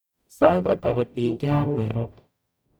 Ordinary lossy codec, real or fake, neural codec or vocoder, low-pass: none; fake; codec, 44.1 kHz, 0.9 kbps, DAC; none